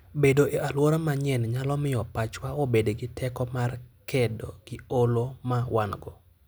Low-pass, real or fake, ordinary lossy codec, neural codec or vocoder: none; real; none; none